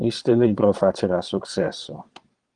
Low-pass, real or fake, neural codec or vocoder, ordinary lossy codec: 9.9 kHz; fake; vocoder, 22.05 kHz, 80 mel bands, Vocos; Opus, 24 kbps